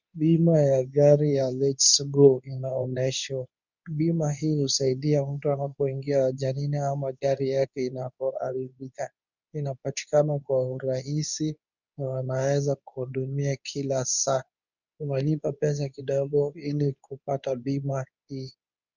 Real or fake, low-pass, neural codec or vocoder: fake; 7.2 kHz; codec, 24 kHz, 0.9 kbps, WavTokenizer, medium speech release version 2